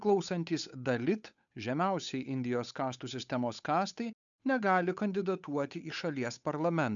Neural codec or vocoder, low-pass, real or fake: codec, 16 kHz, 8 kbps, FunCodec, trained on Chinese and English, 25 frames a second; 7.2 kHz; fake